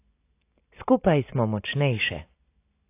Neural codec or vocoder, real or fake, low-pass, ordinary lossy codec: none; real; 3.6 kHz; AAC, 24 kbps